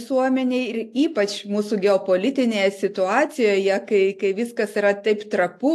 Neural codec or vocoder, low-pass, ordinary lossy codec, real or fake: none; 14.4 kHz; AAC, 64 kbps; real